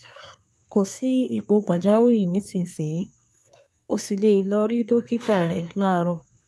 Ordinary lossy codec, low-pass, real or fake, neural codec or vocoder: none; none; fake; codec, 24 kHz, 1 kbps, SNAC